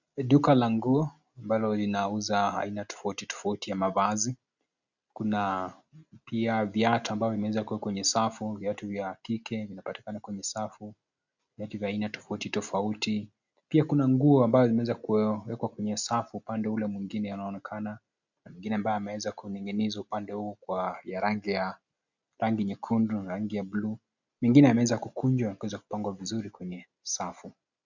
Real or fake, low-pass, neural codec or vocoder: real; 7.2 kHz; none